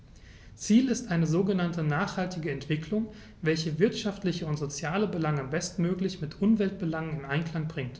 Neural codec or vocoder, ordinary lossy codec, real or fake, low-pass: none; none; real; none